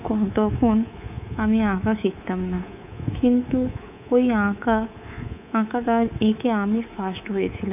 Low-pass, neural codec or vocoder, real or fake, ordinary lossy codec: 3.6 kHz; codec, 24 kHz, 3.1 kbps, DualCodec; fake; none